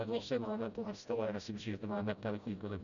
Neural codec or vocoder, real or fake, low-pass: codec, 16 kHz, 0.5 kbps, FreqCodec, smaller model; fake; 7.2 kHz